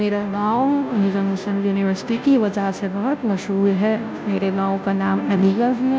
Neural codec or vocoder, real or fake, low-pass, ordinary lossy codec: codec, 16 kHz, 0.5 kbps, FunCodec, trained on Chinese and English, 25 frames a second; fake; none; none